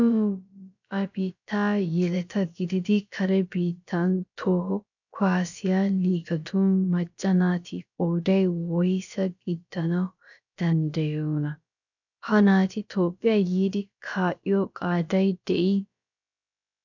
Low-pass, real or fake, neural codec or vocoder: 7.2 kHz; fake; codec, 16 kHz, about 1 kbps, DyCAST, with the encoder's durations